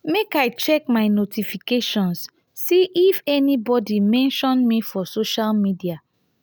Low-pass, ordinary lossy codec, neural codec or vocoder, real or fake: none; none; none; real